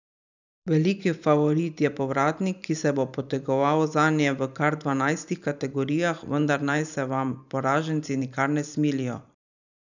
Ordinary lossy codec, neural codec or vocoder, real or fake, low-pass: none; vocoder, 44.1 kHz, 128 mel bands every 512 samples, BigVGAN v2; fake; 7.2 kHz